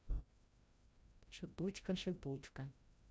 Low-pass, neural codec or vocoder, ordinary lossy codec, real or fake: none; codec, 16 kHz, 0.5 kbps, FreqCodec, larger model; none; fake